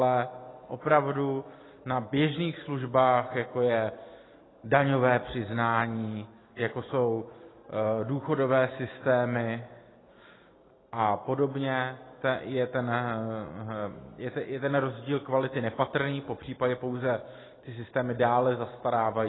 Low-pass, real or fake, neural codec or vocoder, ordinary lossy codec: 7.2 kHz; real; none; AAC, 16 kbps